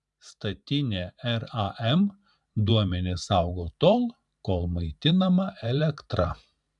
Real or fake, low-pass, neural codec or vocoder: fake; 10.8 kHz; vocoder, 44.1 kHz, 128 mel bands every 512 samples, BigVGAN v2